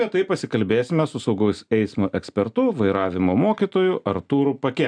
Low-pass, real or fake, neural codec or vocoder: 9.9 kHz; real; none